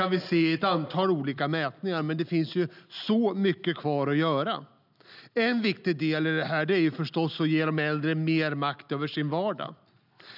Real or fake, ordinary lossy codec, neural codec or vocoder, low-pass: real; none; none; 5.4 kHz